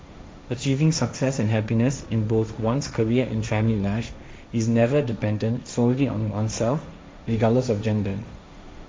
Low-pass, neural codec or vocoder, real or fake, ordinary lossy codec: none; codec, 16 kHz, 1.1 kbps, Voila-Tokenizer; fake; none